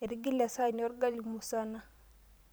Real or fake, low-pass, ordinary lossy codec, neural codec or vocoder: fake; none; none; vocoder, 44.1 kHz, 128 mel bands every 512 samples, BigVGAN v2